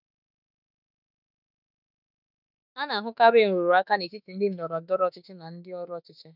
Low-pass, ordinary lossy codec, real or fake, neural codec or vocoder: 5.4 kHz; none; fake; autoencoder, 48 kHz, 32 numbers a frame, DAC-VAE, trained on Japanese speech